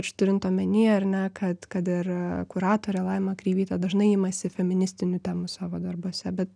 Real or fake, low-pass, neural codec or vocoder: real; 9.9 kHz; none